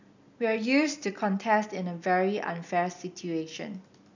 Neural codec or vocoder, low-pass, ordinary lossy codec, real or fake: none; 7.2 kHz; none; real